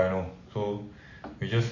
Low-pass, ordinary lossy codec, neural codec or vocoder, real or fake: 7.2 kHz; MP3, 32 kbps; none; real